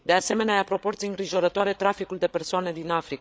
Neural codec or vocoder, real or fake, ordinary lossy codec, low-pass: codec, 16 kHz, 8 kbps, FreqCodec, larger model; fake; none; none